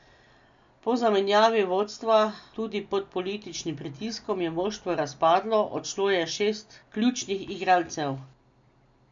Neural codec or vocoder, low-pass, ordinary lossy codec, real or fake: none; 7.2 kHz; MP3, 64 kbps; real